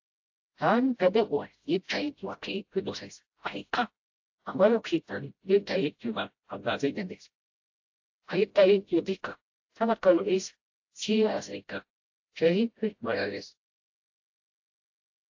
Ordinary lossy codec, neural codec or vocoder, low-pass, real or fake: AAC, 48 kbps; codec, 16 kHz, 0.5 kbps, FreqCodec, smaller model; 7.2 kHz; fake